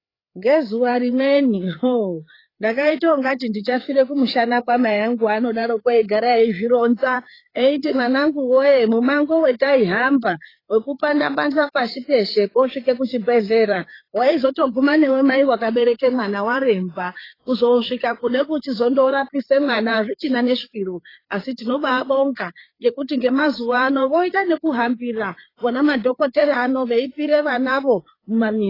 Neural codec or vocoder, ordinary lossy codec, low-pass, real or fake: codec, 16 kHz, 4 kbps, FreqCodec, larger model; AAC, 24 kbps; 5.4 kHz; fake